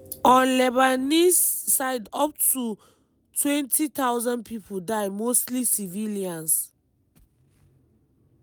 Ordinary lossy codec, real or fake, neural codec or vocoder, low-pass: none; real; none; none